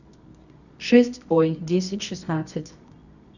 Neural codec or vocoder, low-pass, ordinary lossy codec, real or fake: codec, 24 kHz, 0.9 kbps, WavTokenizer, medium music audio release; 7.2 kHz; none; fake